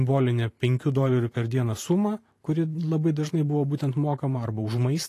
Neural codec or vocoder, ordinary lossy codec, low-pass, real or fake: vocoder, 44.1 kHz, 128 mel bands every 512 samples, BigVGAN v2; AAC, 48 kbps; 14.4 kHz; fake